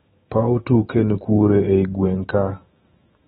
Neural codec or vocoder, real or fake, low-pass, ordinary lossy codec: autoencoder, 48 kHz, 128 numbers a frame, DAC-VAE, trained on Japanese speech; fake; 19.8 kHz; AAC, 16 kbps